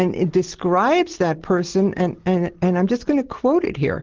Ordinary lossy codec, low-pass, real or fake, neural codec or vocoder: Opus, 16 kbps; 7.2 kHz; real; none